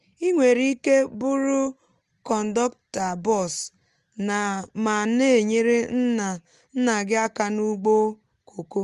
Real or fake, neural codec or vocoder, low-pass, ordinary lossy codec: real; none; 10.8 kHz; Opus, 24 kbps